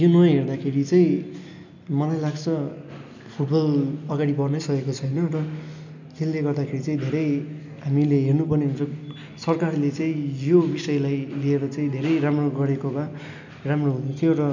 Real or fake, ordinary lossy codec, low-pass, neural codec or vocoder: real; none; 7.2 kHz; none